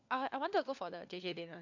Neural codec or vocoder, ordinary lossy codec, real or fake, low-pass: codec, 16 kHz, 4 kbps, FunCodec, trained on LibriTTS, 50 frames a second; AAC, 48 kbps; fake; 7.2 kHz